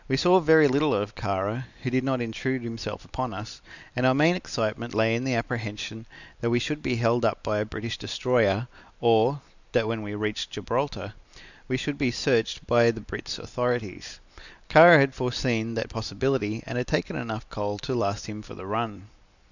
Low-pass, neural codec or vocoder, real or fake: 7.2 kHz; none; real